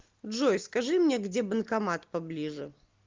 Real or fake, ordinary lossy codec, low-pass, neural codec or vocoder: real; Opus, 32 kbps; 7.2 kHz; none